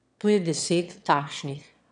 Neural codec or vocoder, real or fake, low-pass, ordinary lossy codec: autoencoder, 22.05 kHz, a latent of 192 numbers a frame, VITS, trained on one speaker; fake; 9.9 kHz; none